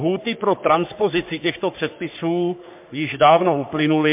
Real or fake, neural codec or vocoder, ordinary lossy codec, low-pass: fake; codec, 44.1 kHz, 3.4 kbps, Pupu-Codec; MP3, 32 kbps; 3.6 kHz